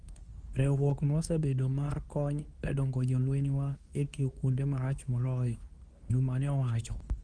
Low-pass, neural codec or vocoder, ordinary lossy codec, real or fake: 9.9 kHz; codec, 24 kHz, 0.9 kbps, WavTokenizer, medium speech release version 2; Opus, 24 kbps; fake